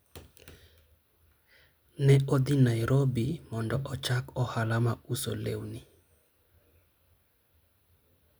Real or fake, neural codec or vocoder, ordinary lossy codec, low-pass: real; none; none; none